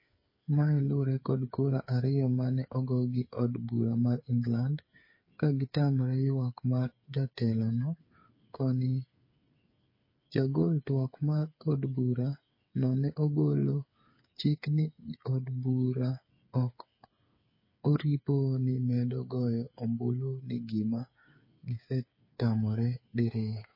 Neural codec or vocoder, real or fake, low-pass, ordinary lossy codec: codec, 16 kHz, 8 kbps, FreqCodec, smaller model; fake; 5.4 kHz; MP3, 24 kbps